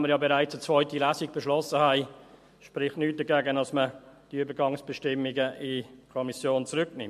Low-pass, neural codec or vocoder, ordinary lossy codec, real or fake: 14.4 kHz; none; MP3, 64 kbps; real